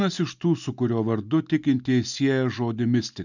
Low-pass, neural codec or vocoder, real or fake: 7.2 kHz; none; real